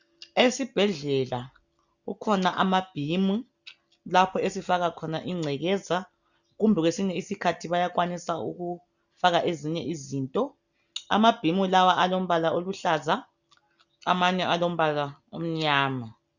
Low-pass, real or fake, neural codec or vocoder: 7.2 kHz; real; none